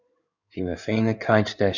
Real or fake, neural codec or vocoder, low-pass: fake; codec, 16 kHz in and 24 kHz out, 2.2 kbps, FireRedTTS-2 codec; 7.2 kHz